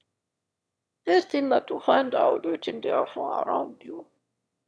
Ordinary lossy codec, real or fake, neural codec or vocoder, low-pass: none; fake; autoencoder, 22.05 kHz, a latent of 192 numbers a frame, VITS, trained on one speaker; none